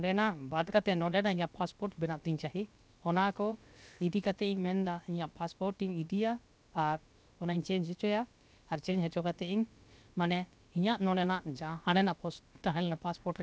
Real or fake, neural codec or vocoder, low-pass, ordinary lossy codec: fake; codec, 16 kHz, about 1 kbps, DyCAST, with the encoder's durations; none; none